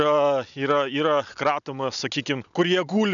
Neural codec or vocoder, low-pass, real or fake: none; 7.2 kHz; real